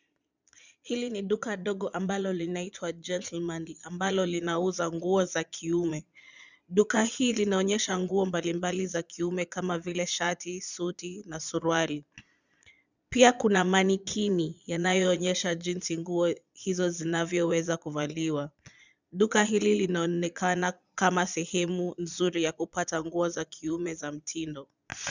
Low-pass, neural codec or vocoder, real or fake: 7.2 kHz; vocoder, 22.05 kHz, 80 mel bands, Vocos; fake